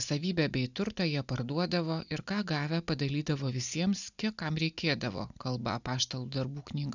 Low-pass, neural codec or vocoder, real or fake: 7.2 kHz; none; real